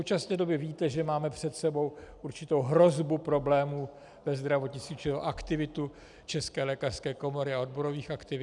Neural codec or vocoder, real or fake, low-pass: none; real; 10.8 kHz